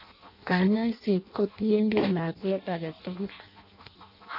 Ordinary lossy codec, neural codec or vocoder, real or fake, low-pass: none; codec, 16 kHz in and 24 kHz out, 0.6 kbps, FireRedTTS-2 codec; fake; 5.4 kHz